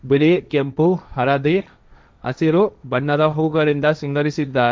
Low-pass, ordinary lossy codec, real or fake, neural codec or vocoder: none; none; fake; codec, 16 kHz, 1.1 kbps, Voila-Tokenizer